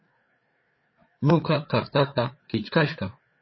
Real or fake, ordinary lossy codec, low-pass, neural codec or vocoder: fake; MP3, 24 kbps; 7.2 kHz; codec, 16 kHz, 4 kbps, FreqCodec, larger model